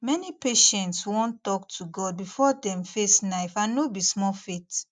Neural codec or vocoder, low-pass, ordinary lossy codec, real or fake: none; none; none; real